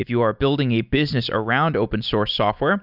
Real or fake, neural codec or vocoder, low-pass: real; none; 5.4 kHz